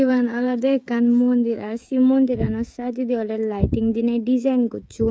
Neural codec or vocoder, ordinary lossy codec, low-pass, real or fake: codec, 16 kHz, 16 kbps, FreqCodec, smaller model; none; none; fake